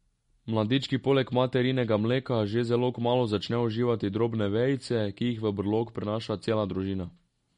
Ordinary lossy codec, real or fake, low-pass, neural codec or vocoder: MP3, 48 kbps; real; 19.8 kHz; none